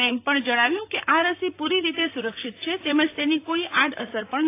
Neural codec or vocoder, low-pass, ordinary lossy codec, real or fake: vocoder, 44.1 kHz, 128 mel bands, Pupu-Vocoder; 3.6 kHz; AAC, 24 kbps; fake